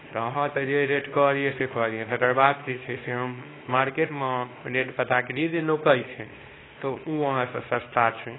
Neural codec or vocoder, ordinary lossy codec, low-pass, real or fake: codec, 24 kHz, 0.9 kbps, WavTokenizer, small release; AAC, 16 kbps; 7.2 kHz; fake